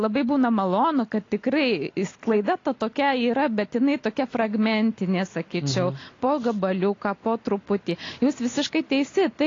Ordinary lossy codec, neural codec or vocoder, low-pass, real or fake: AAC, 32 kbps; none; 7.2 kHz; real